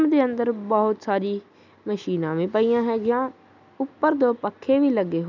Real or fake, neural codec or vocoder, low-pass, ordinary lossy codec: real; none; 7.2 kHz; none